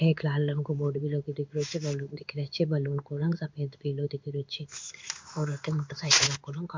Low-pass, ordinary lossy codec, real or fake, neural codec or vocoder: 7.2 kHz; MP3, 64 kbps; fake; codec, 16 kHz in and 24 kHz out, 1 kbps, XY-Tokenizer